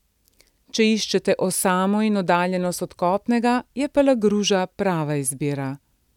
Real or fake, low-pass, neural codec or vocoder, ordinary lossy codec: real; 19.8 kHz; none; none